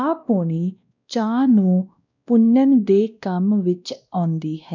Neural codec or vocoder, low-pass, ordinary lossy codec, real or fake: codec, 16 kHz, 1 kbps, X-Codec, WavLM features, trained on Multilingual LibriSpeech; 7.2 kHz; none; fake